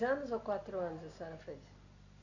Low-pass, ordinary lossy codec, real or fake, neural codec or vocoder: 7.2 kHz; none; real; none